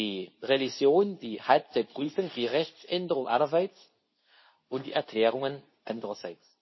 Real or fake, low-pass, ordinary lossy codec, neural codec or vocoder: fake; 7.2 kHz; MP3, 24 kbps; codec, 24 kHz, 0.9 kbps, WavTokenizer, medium speech release version 2